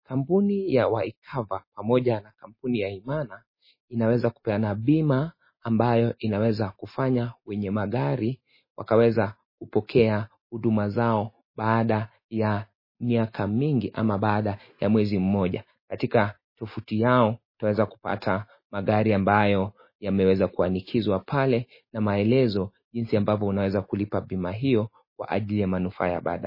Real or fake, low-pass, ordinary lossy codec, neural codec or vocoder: real; 5.4 kHz; MP3, 24 kbps; none